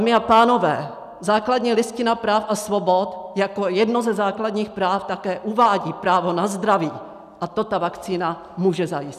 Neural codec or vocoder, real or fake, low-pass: none; real; 14.4 kHz